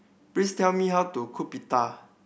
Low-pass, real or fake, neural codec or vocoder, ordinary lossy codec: none; real; none; none